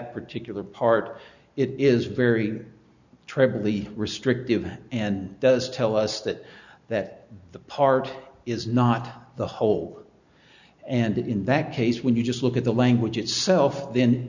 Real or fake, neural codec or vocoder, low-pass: real; none; 7.2 kHz